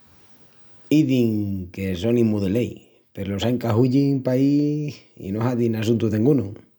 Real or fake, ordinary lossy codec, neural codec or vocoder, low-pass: real; none; none; none